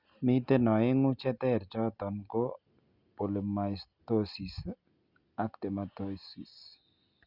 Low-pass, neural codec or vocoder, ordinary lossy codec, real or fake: 5.4 kHz; none; Opus, 64 kbps; real